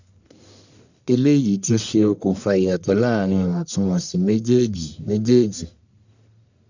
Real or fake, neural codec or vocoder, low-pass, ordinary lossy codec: fake; codec, 44.1 kHz, 1.7 kbps, Pupu-Codec; 7.2 kHz; none